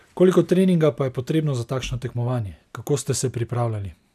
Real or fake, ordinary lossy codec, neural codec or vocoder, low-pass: real; none; none; 14.4 kHz